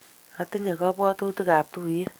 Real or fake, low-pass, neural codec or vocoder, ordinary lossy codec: fake; none; codec, 44.1 kHz, 7.8 kbps, DAC; none